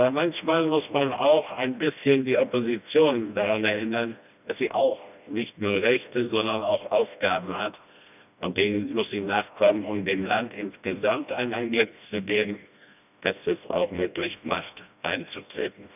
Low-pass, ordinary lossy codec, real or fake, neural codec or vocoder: 3.6 kHz; none; fake; codec, 16 kHz, 1 kbps, FreqCodec, smaller model